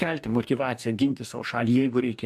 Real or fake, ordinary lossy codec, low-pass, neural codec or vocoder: fake; AAC, 96 kbps; 14.4 kHz; codec, 44.1 kHz, 2.6 kbps, DAC